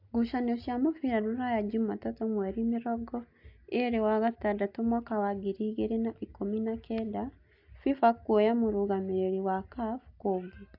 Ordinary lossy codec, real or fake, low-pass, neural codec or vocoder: AAC, 48 kbps; real; 5.4 kHz; none